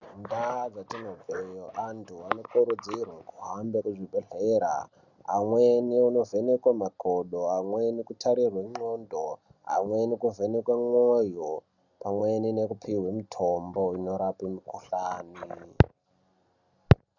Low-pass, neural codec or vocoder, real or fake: 7.2 kHz; none; real